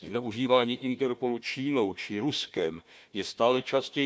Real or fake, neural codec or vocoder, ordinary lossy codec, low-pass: fake; codec, 16 kHz, 1 kbps, FunCodec, trained on Chinese and English, 50 frames a second; none; none